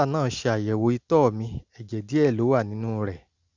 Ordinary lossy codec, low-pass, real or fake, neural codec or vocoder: Opus, 64 kbps; 7.2 kHz; real; none